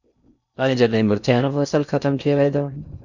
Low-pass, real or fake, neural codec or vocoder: 7.2 kHz; fake; codec, 16 kHz in and 24 kHz out, 0.6 kbps, FocalCodec, streaming, 4096 codes